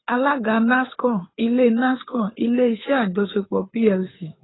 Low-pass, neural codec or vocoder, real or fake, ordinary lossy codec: 7.2 kHz; codec, 24 kHz, 6 kbps, HILCodec; fake; AAC, 16 kbps